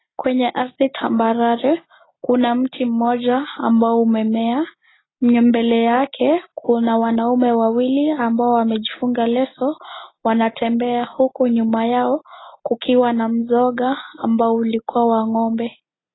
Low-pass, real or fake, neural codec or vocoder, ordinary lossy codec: 7.2 kHz; real; none; AAC, 16 kbps